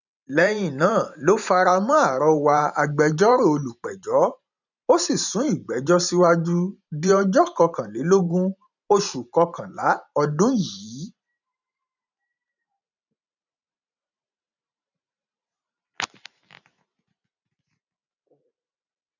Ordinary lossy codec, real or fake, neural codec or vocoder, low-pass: none; fake; vocoder, 44.1 kHz, 128 mel bands every 512 samples, BigVGAN v2; 7.2 kHz